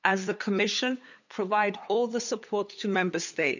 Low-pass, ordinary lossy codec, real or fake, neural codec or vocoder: 7.2 kHz; none; fake; codec, 16 kHz, 8 kbps, FunCodec, trained on LibriTTS, 25 frames a second